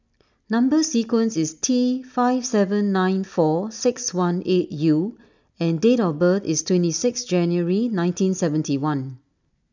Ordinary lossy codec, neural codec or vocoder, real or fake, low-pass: none; none; real; 7.2 kHz